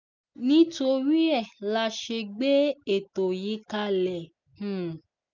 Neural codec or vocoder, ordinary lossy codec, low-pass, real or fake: none; none; 7.2 kHz; real